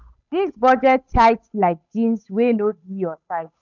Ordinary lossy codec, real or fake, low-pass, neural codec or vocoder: none; fake; 7.2 kHz; codec, 16 kHz, 8 kbps, FunCodec, trained on Chinese and English, 25 frames a second